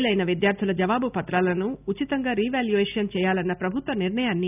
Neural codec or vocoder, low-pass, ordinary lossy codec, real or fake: none; 3.6 kHz; none; real